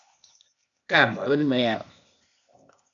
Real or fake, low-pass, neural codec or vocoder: fake; 7.2 kHz; codec, 16 kHz, 0.8 kbps, ZipCodec